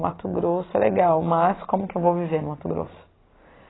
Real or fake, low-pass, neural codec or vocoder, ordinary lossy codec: real; 7.2 kHz; none; AAC, 16 kbps